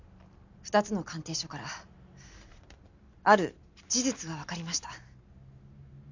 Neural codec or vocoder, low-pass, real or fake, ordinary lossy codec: none; 7.2 kHz; real; none